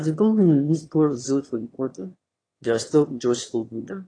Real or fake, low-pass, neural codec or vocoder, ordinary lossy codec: fake; 9.9 kHz; autoencoder, 22.05 kHz, a latent of 192 numbers a frame, VITS, trained on one speaker; AAC, 32 kbps